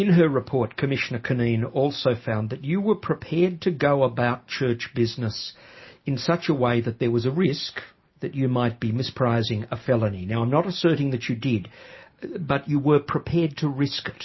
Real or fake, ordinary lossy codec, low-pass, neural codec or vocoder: real; MP3, 24 kbps; 7.2 kHz; none